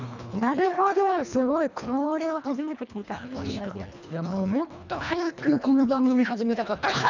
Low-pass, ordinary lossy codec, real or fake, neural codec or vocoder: 7.2 kHz; none; fake; codec, 24 kHz, 1.5 kbps, HILCodec